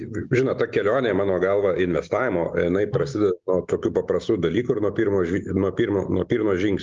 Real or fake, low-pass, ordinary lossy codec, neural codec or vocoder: real; 7.2 kHz; Opus, 32 kbps; none